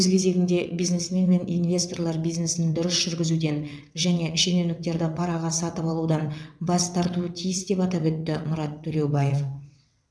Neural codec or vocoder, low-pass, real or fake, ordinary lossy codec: vocoder, 22.05 kHz, 80 mel bands, WaveNeXt; none; fake; none